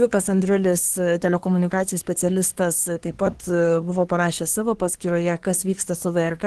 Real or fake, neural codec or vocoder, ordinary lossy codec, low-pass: fake; codec, 24 kHz, 1 kbps, SNAC; Opus, 16 kbps; 10.8 kHz